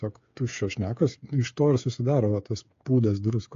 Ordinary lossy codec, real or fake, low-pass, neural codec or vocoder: AAC, 48 kbps; fake; 7.2 kHz; codec, 16 kHz, 8 kbps, FreqCodec, smaller model